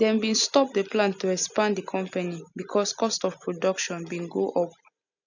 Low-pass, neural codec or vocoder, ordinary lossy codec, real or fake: 7.2 kHz; none; none; real